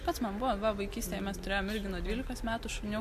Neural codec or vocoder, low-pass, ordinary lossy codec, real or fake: none; 14.4 kHz; MP3, 64 kbps; real